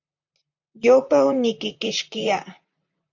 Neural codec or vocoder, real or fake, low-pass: vocoder, 44.1 kHz, 128 mel bands, Pupu-Vocoder; fake; 7.2 kHz